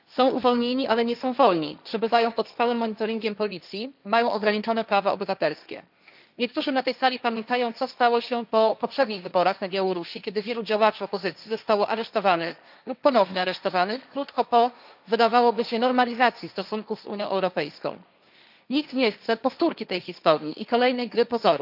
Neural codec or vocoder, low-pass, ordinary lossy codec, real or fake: codec, 16 kHz, 1.1 kbps, Voila-Tokenizer; 5.4 kHz; none; fake